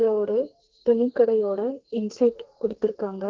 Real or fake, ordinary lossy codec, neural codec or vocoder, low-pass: fake; Opus, 16 kbps; codec, 32 kHz, 1.9 kbps, SNAC; 7.2 kHz